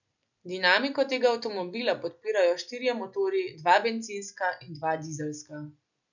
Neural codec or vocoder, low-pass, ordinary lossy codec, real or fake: none; 7.2 kHz; none; real